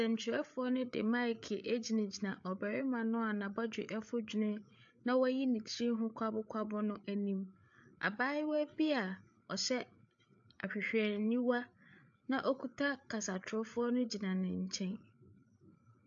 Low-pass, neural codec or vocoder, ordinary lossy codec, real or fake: 7.2 kHz; codec, 16 kHz, 8 kbps, FreqCodec, larger model; AAC, 64 kbps; fake